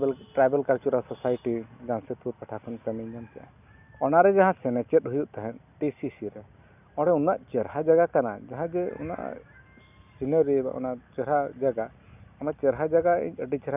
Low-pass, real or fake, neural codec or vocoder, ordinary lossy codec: 3.6 kHz; real; none; none